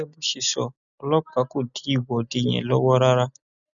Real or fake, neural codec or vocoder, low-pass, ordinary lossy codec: real; none; 7.2 kHz; none